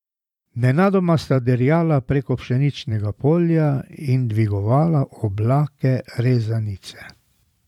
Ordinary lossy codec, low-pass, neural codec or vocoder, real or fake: none; 19.8 kHz; vocoder, 44.1 kHz, 128 mel bands every 512 samples, BigVGAN v2; fake